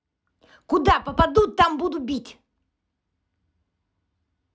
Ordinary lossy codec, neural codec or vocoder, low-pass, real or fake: none; none; none; real